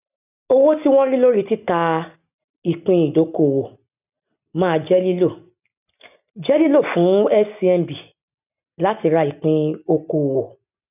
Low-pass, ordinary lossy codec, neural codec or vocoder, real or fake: 3.6 kHz; none; none; real